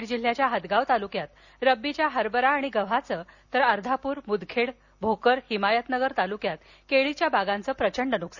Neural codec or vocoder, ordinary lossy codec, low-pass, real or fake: none; none; 7.2 kHz; real